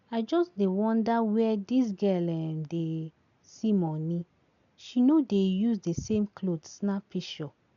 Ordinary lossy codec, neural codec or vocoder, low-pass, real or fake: none; none; 7.2 kHz; real